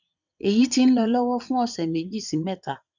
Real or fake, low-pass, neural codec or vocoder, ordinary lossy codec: fake; 7.2 kHz; vocoder, 22.05 kHz, 80 mel bands, WaveNeXt; none